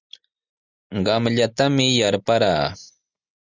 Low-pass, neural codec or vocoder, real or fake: 7.2 kHz; none; real